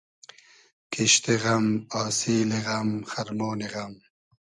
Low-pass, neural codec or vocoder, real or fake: 10.8 kHz; none; real